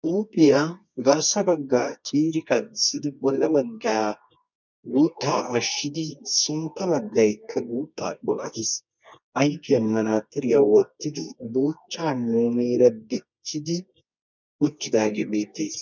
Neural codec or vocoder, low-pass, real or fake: codec, 24 kHz, 0.9 kbps, WavTokenizer, medium music audio release; 7.2 kHz; fake